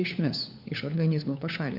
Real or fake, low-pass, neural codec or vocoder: fake; 5.4 kHz; codec, 16 kHz, 4 kbps, FunCodec, trained on LibriTTS, 50 frames a second